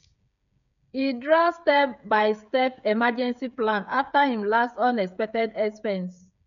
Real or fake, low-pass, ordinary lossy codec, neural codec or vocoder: fake; 7.2 kHz; none; codec, 16 kHz, 8 kbps, FreqCodec, smaller model